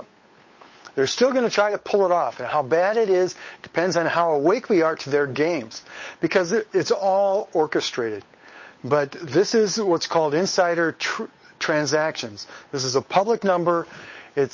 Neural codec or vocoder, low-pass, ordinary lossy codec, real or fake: codec, 16 kHz, 8 kbps, FunCodec, trained on Chinese and English, 25 frames a second; 7.2 kHz; MP3, 32 kbps; fake